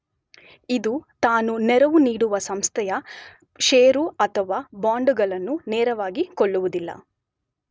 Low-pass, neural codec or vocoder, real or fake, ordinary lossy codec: none; none; real; none